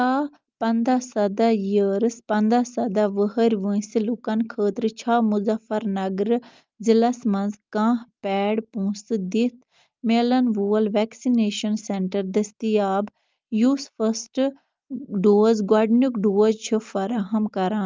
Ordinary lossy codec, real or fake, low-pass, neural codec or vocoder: Opus, 24 kbps; real; 7.2 kHz; none